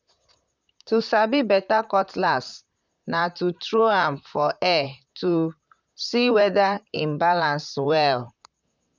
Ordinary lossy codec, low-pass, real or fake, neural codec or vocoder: none; 7.2 kHz; fake; vocoder, 44.1 kHz, 128 mel bands, Pupu-Vocoder